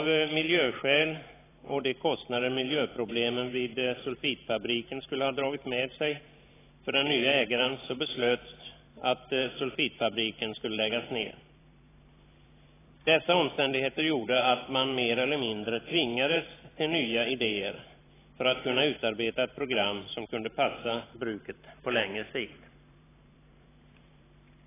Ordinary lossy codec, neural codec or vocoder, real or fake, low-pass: AAC, 16 kbps; vocoder, 44.1 kHz, 128 mel bands every 512 samples, BigVGAN v2; fake; 3.6 kHz